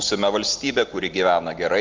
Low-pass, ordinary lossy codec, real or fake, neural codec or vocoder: 7.2 kHz; Opus, 24 kbps; real; none